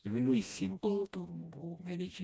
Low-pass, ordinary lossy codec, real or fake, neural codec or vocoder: none; none; fake; codec, 16 kHz, 1 kbps, FreqCodec, smaller model